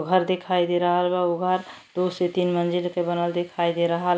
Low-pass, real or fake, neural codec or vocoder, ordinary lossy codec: none; real; none; none